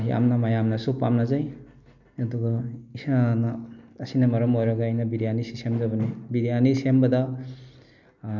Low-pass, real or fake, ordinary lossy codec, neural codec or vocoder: 7.2 kHz; real; none; none